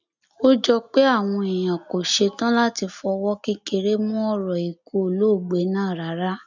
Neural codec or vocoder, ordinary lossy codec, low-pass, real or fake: none; none; 7.2 kHz; real